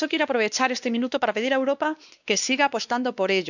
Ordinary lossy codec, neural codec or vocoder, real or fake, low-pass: none; codec, 16 kHz, 2 kbps, X-Codec, WavLM features, trained on Multilingual LibriSpeech; fake; 7.2 kHz